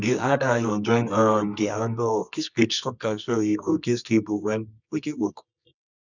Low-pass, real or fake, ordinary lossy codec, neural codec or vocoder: 7.2 kHz; fake; none; codec, 24 kHz, 0.9 kbps, WavTokenizer, medium music audio release